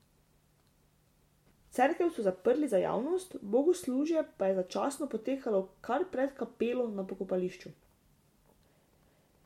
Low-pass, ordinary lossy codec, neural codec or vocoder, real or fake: 19.8 kHz; MP3, 64 kbps; none; real